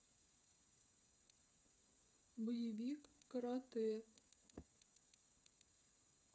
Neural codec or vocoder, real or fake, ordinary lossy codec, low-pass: codec, 16 kHz, 8 kbps, FreqCodec, smaller model; fake; none; none